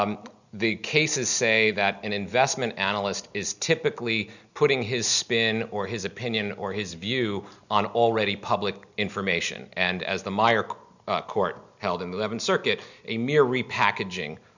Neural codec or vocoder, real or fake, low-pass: none; real; 7.2 kHz